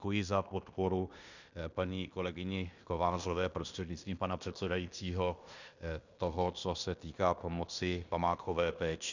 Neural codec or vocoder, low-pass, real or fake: codec, 16 kHz in and 24 kHz out, 0.9 kbps, LongCat-Audio-Codec, fine tuned four codebook decoder; 7.2 kHz; fake